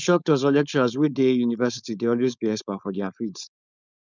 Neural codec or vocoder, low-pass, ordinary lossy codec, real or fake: codec, 16 kHz, 4.8 kbps, FACodec; 7.2 kHz; none; fake